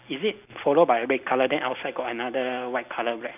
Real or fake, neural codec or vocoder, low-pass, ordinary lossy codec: real; none; 3.6 kHz; none